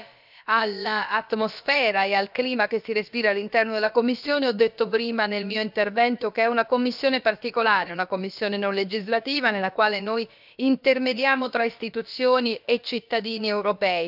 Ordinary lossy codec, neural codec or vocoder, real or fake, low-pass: none; codec, 16 kHz, about 1 kbps, DyCAST, with the encoder's durations; fake; 5.4 kHz